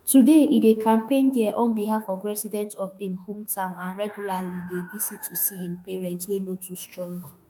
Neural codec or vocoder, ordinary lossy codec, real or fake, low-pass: autoencoder, 48 kHz, 32 numbers a frame, DAC-VAE, trained on Japanese speech; none; fake; none